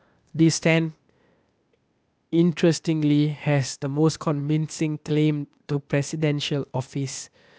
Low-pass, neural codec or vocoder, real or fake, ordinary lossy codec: none; codec, 16 kHz, 0.8 kbps, ZipCodec; fake; none